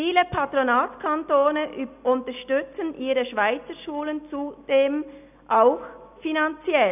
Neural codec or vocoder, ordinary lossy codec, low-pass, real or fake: none; none; 3.6 kHz; real